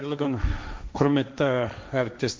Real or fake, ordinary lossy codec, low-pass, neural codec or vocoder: fake; none; none; codec, 16 kHz, 1.1 kbps, Voila-Tokenizer